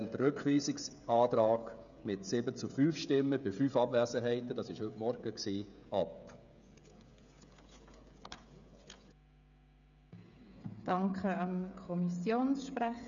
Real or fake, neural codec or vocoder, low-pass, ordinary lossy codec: fake; codec, 16 kHz, 16 kbps, FreqCodec, smaller model; 7.2 kHz; none